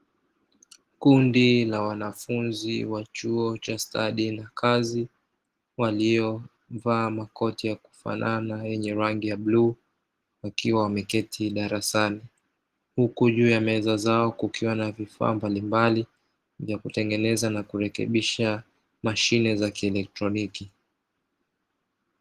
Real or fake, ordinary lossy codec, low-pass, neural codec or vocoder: real; Opus, 16 kbps; 14.4 kHz; none